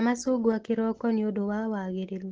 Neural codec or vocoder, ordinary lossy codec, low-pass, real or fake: none; Opus, 16 kbps; 7.2 kHz; real